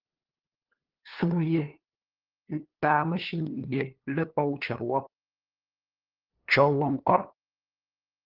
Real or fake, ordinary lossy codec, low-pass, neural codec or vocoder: fake; Opus, 16 kbps; 5.4 kHz; codec, 16 kHz, 2 kbps, FunCodec, trained on LibriTTS, 25 frames a second